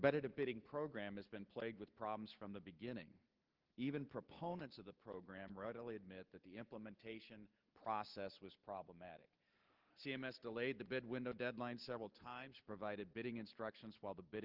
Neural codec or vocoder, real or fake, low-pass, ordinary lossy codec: none; real; 5.4 kHz; Opus, 16 kbps